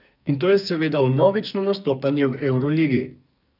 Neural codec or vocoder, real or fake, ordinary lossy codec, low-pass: codec, 44.1 kHz, 2.6 kbps, SNAC; fake; none; 5.4 kHz